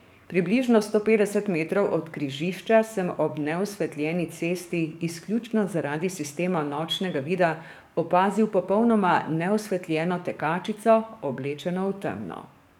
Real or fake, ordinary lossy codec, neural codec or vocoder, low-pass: fake; none; codec, 44.1 kHz, 7.8 kbps, DAC; 19.8 kHz